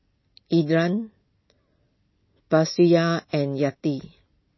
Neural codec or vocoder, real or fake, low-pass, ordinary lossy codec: none; real; 7.2 kHz; MP3, 24 kbps